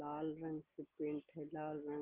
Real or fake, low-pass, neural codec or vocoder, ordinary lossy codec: real; 3.6 kHz; none; Opus, 16 kbps